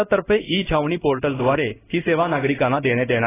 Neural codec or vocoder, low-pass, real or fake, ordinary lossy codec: codec, 16 kHz in and 24 kHz out, 1 kbps, XY-Tokenizer; 3.6 kHz; fake; AAC, 16 kbps